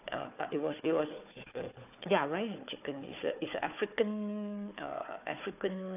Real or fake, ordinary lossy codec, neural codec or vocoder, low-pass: fake; AAC, 32 kbps; codec, 16 kHz, 4 kbps, FunCodec, trained on Chinese and English, 50 frames a second; 3.6 kHz